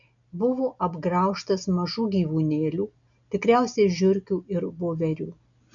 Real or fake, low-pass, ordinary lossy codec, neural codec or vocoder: real; 7.2 kHz; MP3, 96 kbps; none